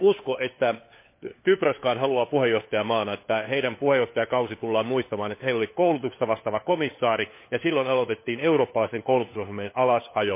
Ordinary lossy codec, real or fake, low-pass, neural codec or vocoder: MP3, 24 kbps; fake; 3.6 kHz; codec, 16 kHz, 4 kbps, FunCodec, trained on LibriTTS, 50 frames a second